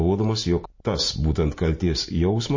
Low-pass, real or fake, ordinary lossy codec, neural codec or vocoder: 7.2 kHz; real; MP3, 32 kbps; none